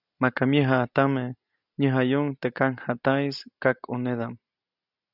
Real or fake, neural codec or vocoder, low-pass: real; none; 5.4 kHz